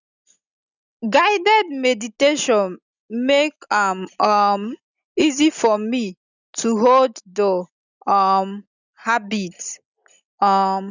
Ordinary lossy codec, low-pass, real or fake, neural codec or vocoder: none; 7.2 kHz; real; none